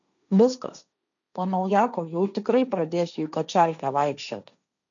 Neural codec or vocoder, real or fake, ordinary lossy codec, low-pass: codec, 16 kHz, 1.1 kbps, Voila-Tokenizer; fake; MP3, 96 kbps; 7.2 kHz